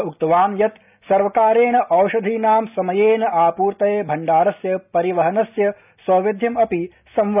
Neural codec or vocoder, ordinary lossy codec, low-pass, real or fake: none; none; 3.6 kHz; real